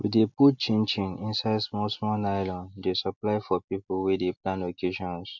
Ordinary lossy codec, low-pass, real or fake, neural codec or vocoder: MP3, 64 kbps; 7.2 kHz; real; none